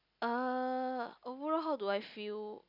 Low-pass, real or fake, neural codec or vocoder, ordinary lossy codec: 5.4 kHz; real; none; none